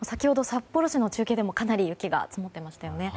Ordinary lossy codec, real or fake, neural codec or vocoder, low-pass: none; real; none; none